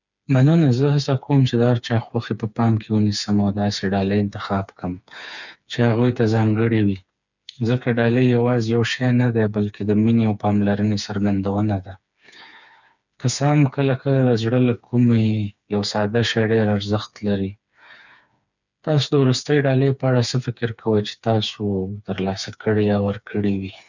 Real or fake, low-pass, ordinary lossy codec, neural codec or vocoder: fake; 7.2 kHz; none; codec, 16 kHz, 4 kbps, FreqCodec, smaller model